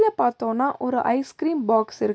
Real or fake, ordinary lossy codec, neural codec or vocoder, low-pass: real; none; none; none